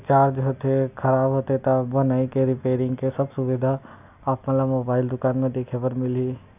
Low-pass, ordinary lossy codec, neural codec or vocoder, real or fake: 3.6 kHz; none; none; real